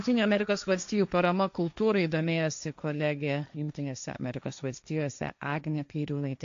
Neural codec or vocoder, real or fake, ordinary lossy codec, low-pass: codec, 16 kHz, 1.1 kbps, Voila-Tokenizer; fake; AAC, 64 kbps; 7.2 kHz